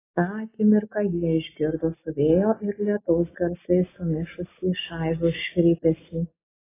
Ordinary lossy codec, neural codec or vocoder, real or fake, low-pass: AAC, 16 kbps; none; real; 3.6 kHz